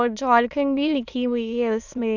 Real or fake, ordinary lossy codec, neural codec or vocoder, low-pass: fake; none; autoencoder, 22.05 kHz, a latent of 192 numbers a frame, VITS, trained on many speakers; 7.2 kHz